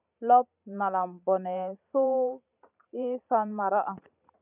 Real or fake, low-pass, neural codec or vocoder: fake; 3.6 kHz; vocoder, 44.1 kHz, 128 mel bands every 512 samples, BigVGAN v2